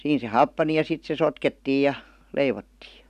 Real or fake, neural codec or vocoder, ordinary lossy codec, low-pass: real; none; none; 14.4 kHz